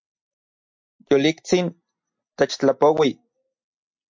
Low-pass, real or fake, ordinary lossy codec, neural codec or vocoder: 7.2 kHz; real; MP3, 48 kbps; none